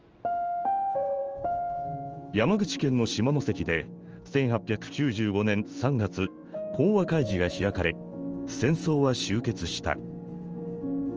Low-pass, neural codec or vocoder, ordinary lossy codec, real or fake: 7.2 kHz; codec, 16 kHz in and 24 kHz out, 1 kbps, XY-Tokenizer; Opus, 24 kbps; fake